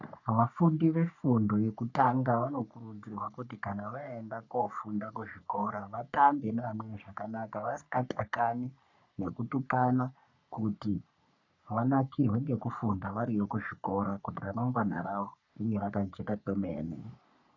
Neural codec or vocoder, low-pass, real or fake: codec, 44.1 kHz, 3.4 kbps, Pupu-Codec; 7.2 kHz; fake